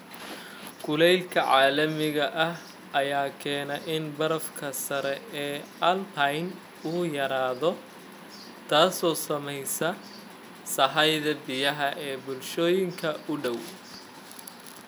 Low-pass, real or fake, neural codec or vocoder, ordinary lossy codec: none; real; none; none